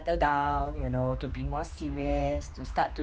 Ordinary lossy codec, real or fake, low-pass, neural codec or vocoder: none; fake; none; codec, 16 kHz, 2 kbps, X-Codec, HuBERT features, trained on general audio